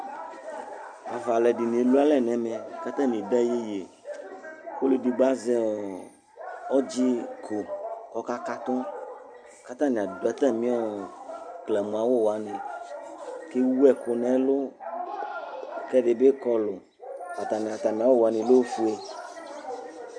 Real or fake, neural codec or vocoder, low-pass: real; none; 9.9 kHz